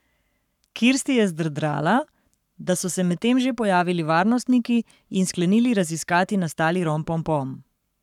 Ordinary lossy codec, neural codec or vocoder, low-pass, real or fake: none; codec, 44.1 kHz, 7.8 kbps, Pupu-Codec; 19.8 kHz; fake